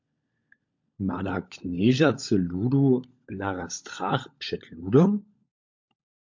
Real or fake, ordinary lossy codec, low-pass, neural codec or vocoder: fake; MP3, 48 kbps; 7.2 kHz; codec, 16 kHz, 16 kbps, FunCodec, trained on LibriTTS, 50 frames a second